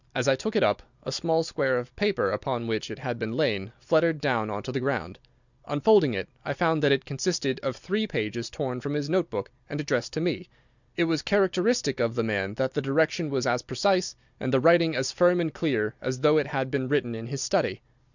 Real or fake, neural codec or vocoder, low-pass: real; none; 7.2 kHz